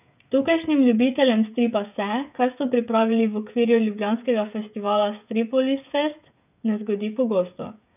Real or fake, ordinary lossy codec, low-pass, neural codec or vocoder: fake; none; 3.6 kHz; codec, 16 kHz, 16 kbps, FreqCodec, smaller model